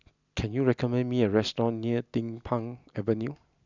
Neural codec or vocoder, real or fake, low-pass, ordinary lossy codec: none; real; 7.2 kHz; none